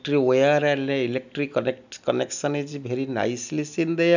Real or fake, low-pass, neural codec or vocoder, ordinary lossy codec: real; 7.2 kHz; none; none